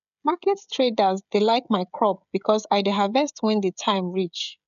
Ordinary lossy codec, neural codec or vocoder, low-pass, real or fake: none; codec, 16 kHz, 16 kbps, FreqCodec, smaller model; 7.2 kHz; fake